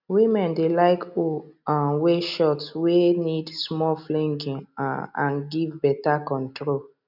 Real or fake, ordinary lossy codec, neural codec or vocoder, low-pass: real; none; none; 5.4 kHz